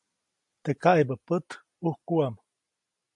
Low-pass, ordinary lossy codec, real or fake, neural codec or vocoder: 10.8 kHz; AAC, 64 kbps; real; none